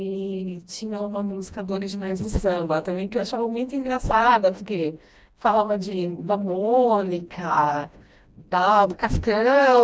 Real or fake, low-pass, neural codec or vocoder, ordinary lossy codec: fake; none; codec, 16 kHz, 1 kbps, FreqCodec, smaller model; none